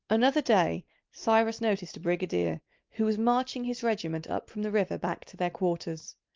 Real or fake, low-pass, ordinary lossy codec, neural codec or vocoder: real; 7.2 kHz; Opus, 24 kbps; none